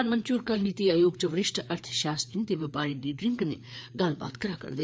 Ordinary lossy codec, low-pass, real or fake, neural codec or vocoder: none; none; fake; codec, 16 kHz, 4 kbps, FreqCodec, larger model